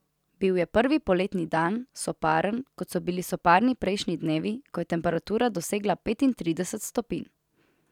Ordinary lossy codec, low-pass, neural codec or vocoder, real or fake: none; 19.8 kHz; none; real